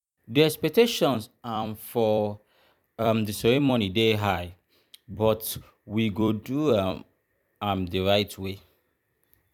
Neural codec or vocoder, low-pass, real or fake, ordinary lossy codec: vocoder, 44.1 kHz, 128 mel bands every 256 samples, BigVGAN v2; 19.8 kHz; fake; none